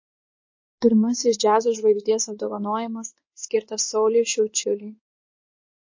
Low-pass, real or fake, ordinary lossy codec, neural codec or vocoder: 7.2 kHz; fake; MP3, 32 kbps; codec, 16 kHz in and 24 kHz out, 1 kbps, XY-Tokenizer